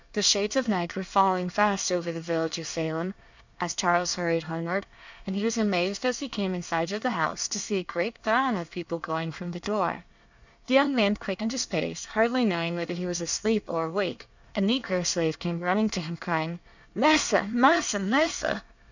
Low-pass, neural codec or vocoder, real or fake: 7.2 kHz; codec, 24 kHz, 1 kbps, SNAC; fake